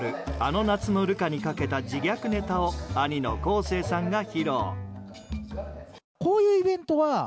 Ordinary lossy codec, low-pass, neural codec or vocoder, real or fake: none; none; none; real